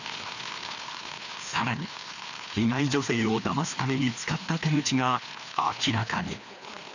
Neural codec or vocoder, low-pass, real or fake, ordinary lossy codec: codec, 16 kHz, 2 kbps, FreqCodec, larger model; 7.2 kHz; fake; none